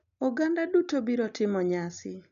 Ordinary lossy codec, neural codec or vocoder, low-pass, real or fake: none; none; 7.2 kHz; real